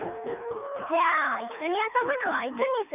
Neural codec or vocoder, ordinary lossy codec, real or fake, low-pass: codec, 24 kHz, 3 kbps, HILCodec; none; fake; 3.6 kHz